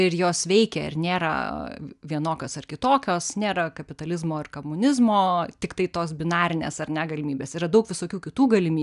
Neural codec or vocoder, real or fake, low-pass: none; real; 10.8 kHz